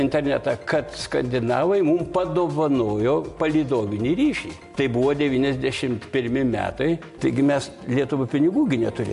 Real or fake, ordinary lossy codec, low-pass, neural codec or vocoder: real; MP3, 64 kbps; 10.8 kHz; none